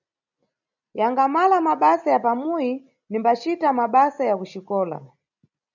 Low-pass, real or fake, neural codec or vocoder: 7.2 kHz; real; none